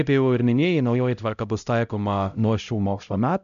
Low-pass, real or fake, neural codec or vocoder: 7.2 kHz; fake; codec, 16 kHz, 0.5 kbps, X-Codec, HuBERT features, trained on LibriSpeech